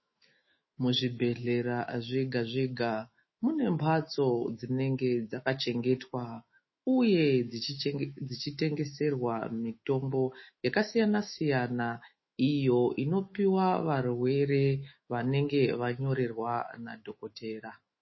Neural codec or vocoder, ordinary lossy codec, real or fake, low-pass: autoencoder, 48 kHz, 128 numbers a frame, DAC-VAE, trained on Japanese speech; MP3, 24 kbps; fake; 7.2 kHz